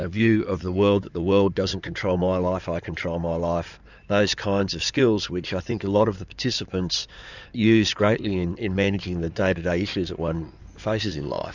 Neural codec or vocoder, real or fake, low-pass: codec, 16 kHz in and 24 kHz out, 2.2 kbps, FireRedTTS-2 codec; fake; 7.2 kHz